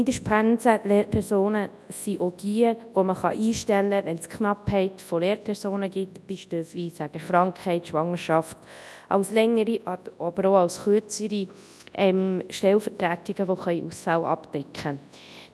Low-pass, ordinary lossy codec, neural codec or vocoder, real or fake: none; none; codec, 24 kHz, 0.9 kbps, WavTokenizer, large speech release; fake